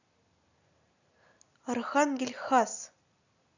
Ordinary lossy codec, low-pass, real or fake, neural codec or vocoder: none; 7.2 kHz; real; none